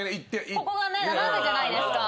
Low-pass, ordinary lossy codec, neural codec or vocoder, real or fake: none; none; none; real